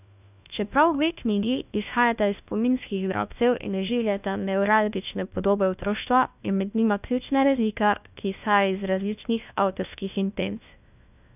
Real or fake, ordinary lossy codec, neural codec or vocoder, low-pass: fake; none; codec, 16 kHz, 1 kbps, FunCodec, trained on LibriTTS, 50 frames a second; 3.6 kHz